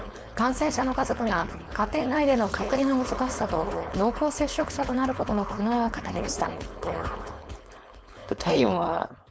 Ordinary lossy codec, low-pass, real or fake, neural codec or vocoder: none; none; fake; codec, 16 kHz, 4.8 kbps, FACodec